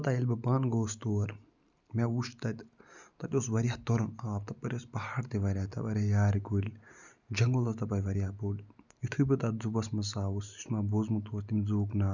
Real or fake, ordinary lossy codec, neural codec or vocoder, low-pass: real; none; none; 7.2 kHz